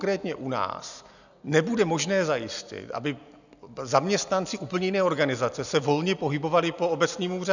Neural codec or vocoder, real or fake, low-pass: none; real; 7.2 kHz